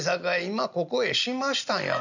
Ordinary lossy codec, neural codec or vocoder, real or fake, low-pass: none; none; real; 7.2 kHz